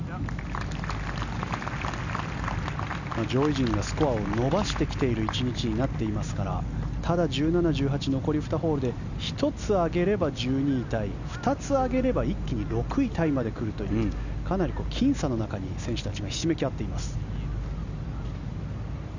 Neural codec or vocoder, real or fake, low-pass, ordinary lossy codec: none; real; 7.2 kHz; none